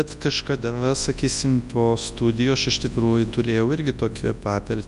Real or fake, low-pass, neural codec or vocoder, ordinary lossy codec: fake; 10.8 kHz; codec, 24 kHz, 0.9 kbps, WavTokenizer, large speech release; MP3, 64 kbps